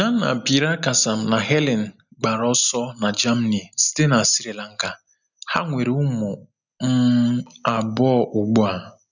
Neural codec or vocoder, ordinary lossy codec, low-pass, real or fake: none; none; 7.2 kHz; real